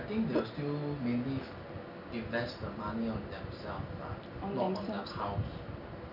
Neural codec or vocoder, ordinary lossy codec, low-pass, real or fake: none; none; 5.4 kHz; real